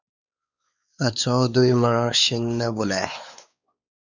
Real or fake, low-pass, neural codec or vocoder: fake; 7.2 kHz; codec, 16 kHz, 4 kbps, X-Codec, WavLM features, trained on Multilingual LibriSpeech